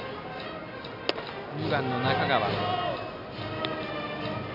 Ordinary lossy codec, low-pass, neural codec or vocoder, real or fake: none; 5.4 kHz; none; real